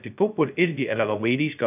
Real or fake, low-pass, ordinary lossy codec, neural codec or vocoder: fake; 3.6 kHz; AAC, 32 kbps; codec, 16 kHz, 0.2 kbps, FocalCodec